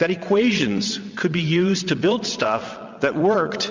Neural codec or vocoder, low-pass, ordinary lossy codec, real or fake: vocoder, 22.05 kHz, 80 mel bands, WaveNeXt; 7.2 kHz; MP3, 64 kbps; fake